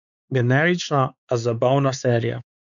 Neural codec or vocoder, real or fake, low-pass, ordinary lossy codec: codec, 16 kHz, 4 kbps, X-Codec, WavLM features, trained on Multilingual LibriSpeech; fake; 7.2 kHz; none